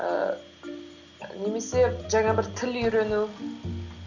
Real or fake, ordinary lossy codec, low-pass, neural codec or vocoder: real; none; 7.2 kHz; none